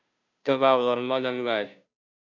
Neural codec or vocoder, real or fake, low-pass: codec, 16 kHz, 0.5 kbps, FunCodec, trained on Chinese and English, 25 frames a second; fake; 7.2 kHz